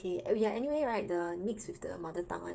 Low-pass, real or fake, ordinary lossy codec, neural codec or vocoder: none; fake; none; codec, 16 kHz, 8 kbps, FreqCodec, smaller model